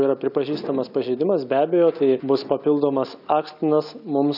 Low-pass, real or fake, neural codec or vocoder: 5.4 kHz; real; none